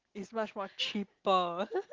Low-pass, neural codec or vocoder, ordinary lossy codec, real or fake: 7.2 kHz; none; Opus, 16 kbps; real